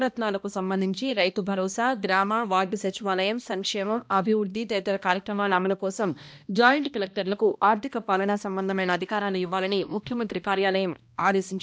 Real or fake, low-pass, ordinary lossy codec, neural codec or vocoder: fake; none; none; codec, 16 kHz, 1 kbps, X-Codec, HuBERT features, trained on balanced general audio